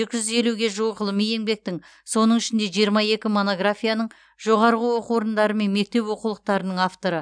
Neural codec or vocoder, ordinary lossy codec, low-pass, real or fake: none; none; 9.9 kHz; real